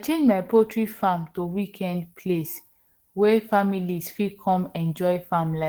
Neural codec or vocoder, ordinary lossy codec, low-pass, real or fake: codec, 44.1 kHz, 7.8 kbps, DAC; Opus, 16 kbps; 19.8 kHz; fake